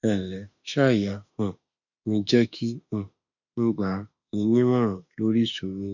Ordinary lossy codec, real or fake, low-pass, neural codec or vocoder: none; fake; 7.2 kHz; autoencoder, 48 kHz, 32 numbers a frame, DAC-VAE, trained on Japanese speech